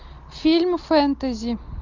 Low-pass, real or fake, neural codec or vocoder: 7.2 kHz; real; none